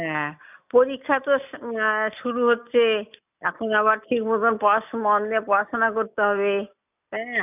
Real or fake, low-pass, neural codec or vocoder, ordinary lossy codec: real; 3.6 kHz; none; none